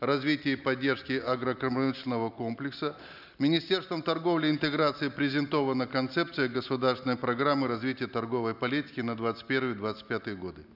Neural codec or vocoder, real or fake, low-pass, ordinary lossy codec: none; real; 5.4 kHz; none